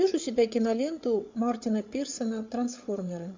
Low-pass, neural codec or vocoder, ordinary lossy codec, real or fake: 7.2 kHz; codec, 16 kHz, 8 kbps, FreqCodec, larger model; MP3, 64 kbps; fake